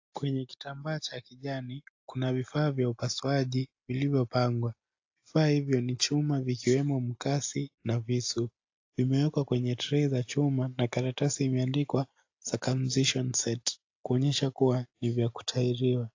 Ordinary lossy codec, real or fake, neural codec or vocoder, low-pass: AAC, 48 kbps; real; none; 7.2 kHz